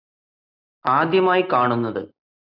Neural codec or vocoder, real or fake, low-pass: none; real; 5.4 kHz